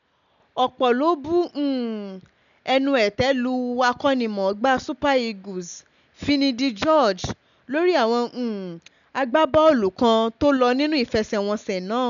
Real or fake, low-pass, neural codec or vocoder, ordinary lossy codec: real; 7.2 kHz; none; none